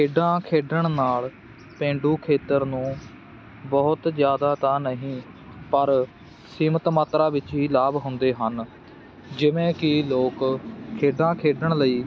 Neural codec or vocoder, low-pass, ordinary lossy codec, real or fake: none; none; none; real